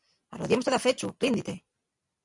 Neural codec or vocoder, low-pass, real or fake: vocoder, 44.1 kHz, 128 mel bands every 256 samples, BigVGAN v2; 10.8 kHz; fake